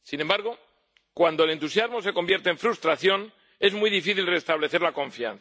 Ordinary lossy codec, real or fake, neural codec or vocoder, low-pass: none; real; none; none